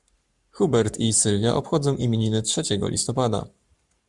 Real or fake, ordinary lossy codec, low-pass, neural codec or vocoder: fake; Opus, 64 kbps; 10.8 kHz; codec, 44.1 kHz, 7.8 kbps, Pupu-Codec